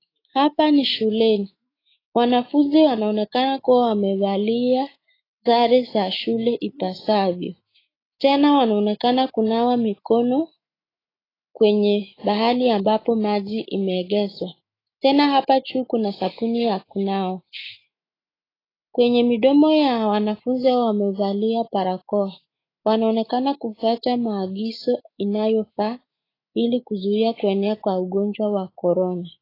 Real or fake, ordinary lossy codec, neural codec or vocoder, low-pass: fake; AAC, 24 kbps; autoencoder, 48 kHz, 128 numbers a frame, DAC-VAE, trained on Japanese speech; 5.4 kHz